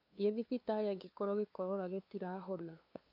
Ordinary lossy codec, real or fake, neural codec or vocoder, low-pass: none; fake; codec, 16 kHz, 2 kbps, FunCodec, trained on LibriTTS, 25 frames a second; 5.4 kHz